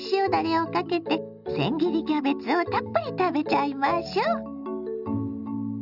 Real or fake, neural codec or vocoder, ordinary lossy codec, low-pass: real; none; none; 5.4 kHz